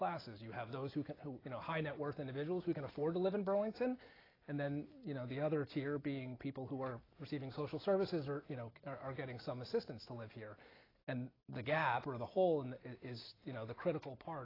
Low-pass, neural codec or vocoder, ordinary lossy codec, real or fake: 5.4 kHz; none; AAC, 24 kbps; real